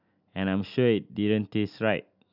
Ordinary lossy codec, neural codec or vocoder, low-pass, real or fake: none; none; 5.4 kHz; real